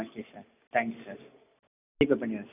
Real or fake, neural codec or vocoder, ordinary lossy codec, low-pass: real; none; none; 3.6 kHz